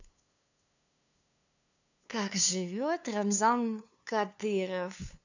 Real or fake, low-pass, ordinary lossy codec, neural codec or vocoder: fake; 7.2 kHz; none; codec, 16 kHz, 2 kbps, FunCodec, trained on LibriTTS, 25 frames a second